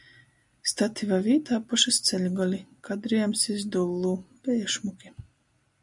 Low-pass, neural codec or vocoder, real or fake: 10.8 kHz; none; real